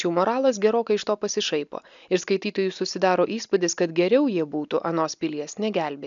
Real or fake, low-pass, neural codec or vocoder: real; 7.2 kHz; none